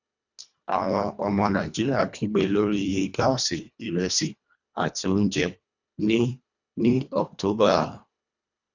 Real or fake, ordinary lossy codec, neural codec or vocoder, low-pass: fake; none; codec, 24 kHz, 1.5 kbps, HILCodec; 7.2 kHz